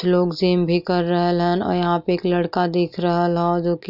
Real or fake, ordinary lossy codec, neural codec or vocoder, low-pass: real; none; none; 5.4 kHz